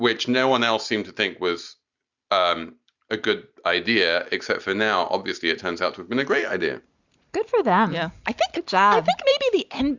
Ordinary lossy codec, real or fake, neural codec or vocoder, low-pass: Opus, 64 kbps; real; none; 7.2 kHz